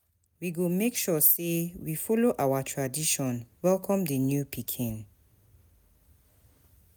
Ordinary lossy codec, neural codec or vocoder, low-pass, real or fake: none; none; none; real